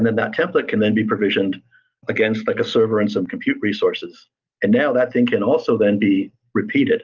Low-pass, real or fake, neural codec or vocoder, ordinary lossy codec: 7.2 kHz; real; none; Opus, 24 kbps